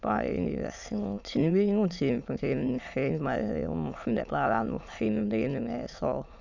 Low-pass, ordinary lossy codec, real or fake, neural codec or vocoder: 7.2 kHz; none; fake; autoencoder, 22.05 kHz, a latent of 192 numbers a frame, VITS, trained on many speakers